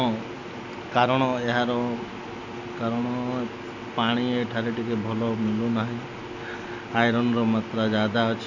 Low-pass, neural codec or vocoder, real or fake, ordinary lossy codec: 7.2 kHz; none; real; none